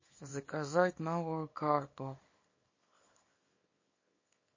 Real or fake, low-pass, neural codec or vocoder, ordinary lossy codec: fake; 7.2 kHz; codec, 24 kHz, 0.9 kbps, WavTokenizer, small release; MP3, 32 kbps